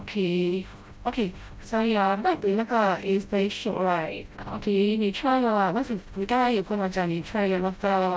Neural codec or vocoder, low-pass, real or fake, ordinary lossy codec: codec, 16 kHz, 0.5 kbps, FreqCodec, smaller model; none; fake; none